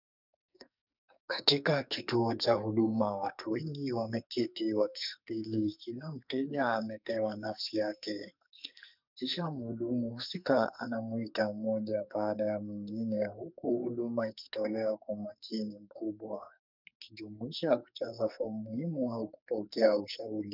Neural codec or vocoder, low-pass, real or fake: codec, 44.1 kHz, 2.6 kbps, SNAC; 5.4 kHz; fake